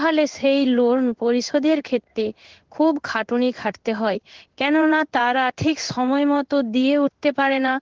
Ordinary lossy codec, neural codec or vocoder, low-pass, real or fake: Opus, 16 kbps; codec, 16 kHz in and 24 kHz out, 1 kbps, XY-Tokenizer; 7.2 kHz; fake